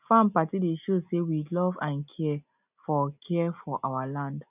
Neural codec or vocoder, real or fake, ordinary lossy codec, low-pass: none; real; none; 3.6 kHz